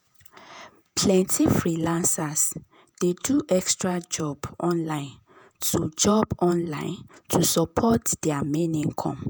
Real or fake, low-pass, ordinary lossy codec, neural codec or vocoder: fake; none; none; vocoder, 48 kHz, 128 mel bands, Vocos